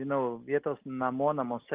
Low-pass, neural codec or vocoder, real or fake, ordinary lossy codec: 3.6 kHz; none; real; Opus, 32 kbps